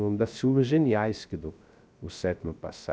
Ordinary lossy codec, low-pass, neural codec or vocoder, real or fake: none; none; codec, 16 kHz, 0.3 kbps, FocalCodec; fake